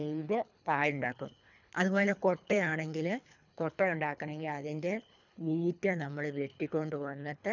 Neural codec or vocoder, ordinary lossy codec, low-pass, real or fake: codec, 24 kHz, 3 kbps, HILCodec; none; 7.2 kHz; fake